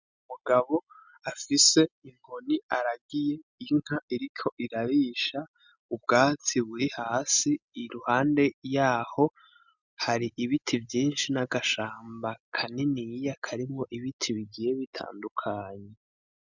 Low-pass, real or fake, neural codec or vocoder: 7.2 kHz; real; none